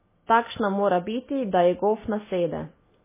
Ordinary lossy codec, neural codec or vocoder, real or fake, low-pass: MP3, 16 kbps; none; real; 3.6 kHz